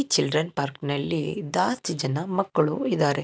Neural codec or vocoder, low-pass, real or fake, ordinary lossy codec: none; none; real; none